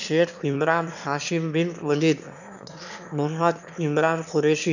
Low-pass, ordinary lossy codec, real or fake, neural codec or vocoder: 7.2 kHz; none; fake; autoencoder, 22.05 kHz, a latent of 192 numbers a frame, VITS, trained on one speaker